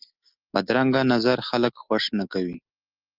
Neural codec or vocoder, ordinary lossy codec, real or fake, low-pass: none; Opus, 32 kbps; real; 5.4 kHz